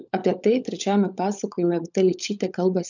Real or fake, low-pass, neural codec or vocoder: fake; 7.2 kHz; codec, 16 kHz, 16 kbps, FunCodec, trained on LibriTTS, 50 frames a second